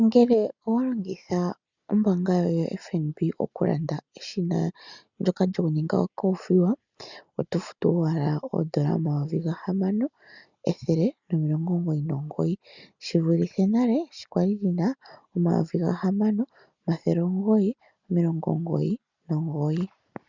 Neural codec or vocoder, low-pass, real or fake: none; 7.2 kHz; real